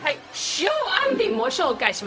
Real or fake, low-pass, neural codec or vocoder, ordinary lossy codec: fake; none; codec, 16 kHz, 0.4 kbps, LongCat-Audio-Codec; none